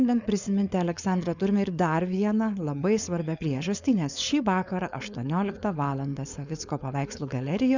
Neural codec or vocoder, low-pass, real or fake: codec, 16 kHz, 4.8 kbps, FACodec; 7.2 kHz; fake